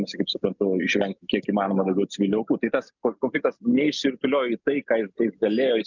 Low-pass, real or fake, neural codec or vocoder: 7.2 kHz; real; none